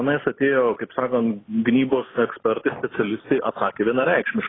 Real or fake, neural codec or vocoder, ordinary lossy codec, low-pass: real; none; AAC, 16 kbps; 7.2 kHz